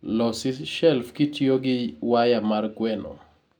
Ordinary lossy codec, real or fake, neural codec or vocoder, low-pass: none; real; none; 19.8 kHz